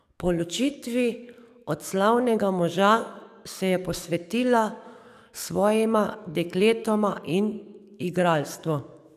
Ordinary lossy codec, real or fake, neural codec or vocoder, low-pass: none; fake; codec, 44.1 kHz, 7.8 kbps, DAC; 14.4 kHz